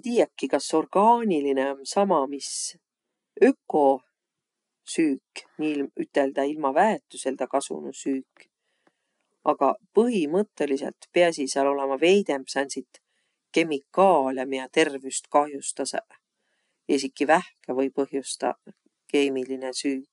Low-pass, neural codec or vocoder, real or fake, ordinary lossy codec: 10.8 kHz; none; real; none